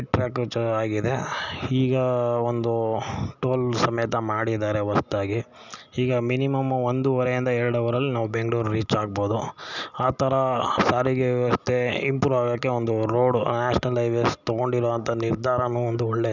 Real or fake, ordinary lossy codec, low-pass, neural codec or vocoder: real; none; 7.2 kHz; none